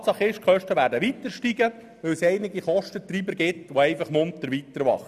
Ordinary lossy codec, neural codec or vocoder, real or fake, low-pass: none; none; real; 14.4 kHz